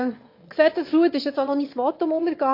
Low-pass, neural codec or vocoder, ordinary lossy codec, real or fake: 5.4 kHz; autoencoder, 22.05 kHz, a latent of 192 numbers a frame, VITS, trained on one speaker; MP3, 32 kbps; fake